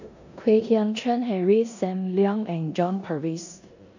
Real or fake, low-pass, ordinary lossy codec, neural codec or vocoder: fake; 7.2 kHz; none; codec, 16 kHz in and 24 kHz out, 0.9 kbps, LongCat-Audio-Codec, four codebook decoder